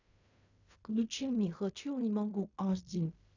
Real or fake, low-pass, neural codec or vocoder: fake; 7.2 kHz; codec, 16 kHz in and 24 kHz out, 0.4 kbps, LongCat-Audio-Codec, fine tuned four codebook decoder